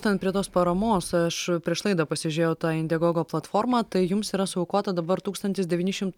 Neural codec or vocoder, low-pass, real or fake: none; 19.8 kHz; real